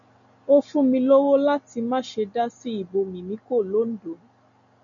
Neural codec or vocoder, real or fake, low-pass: none; real; 7.2 kHz